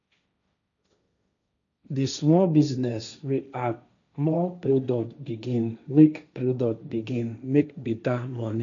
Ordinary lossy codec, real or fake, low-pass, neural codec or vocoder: none; fake; 7.2 kHz; codec, 16 kHz, 1.1 kbps, Voila-Tokenizer